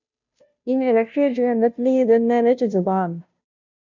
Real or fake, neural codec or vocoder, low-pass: fake; codec, 16 kHz, 0.5 kbps, FunCodec, trained on Chinese and English, 25 frames a second; 7.2 kHz